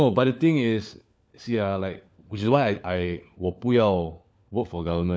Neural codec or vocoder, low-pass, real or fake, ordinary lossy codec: codec, 16 kHz, 4 kbps, FunCodec, trained on LibriTTS, 50 frames a second; none; fake; none